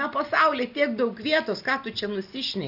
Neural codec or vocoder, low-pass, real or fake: none; 5.4 kHz; real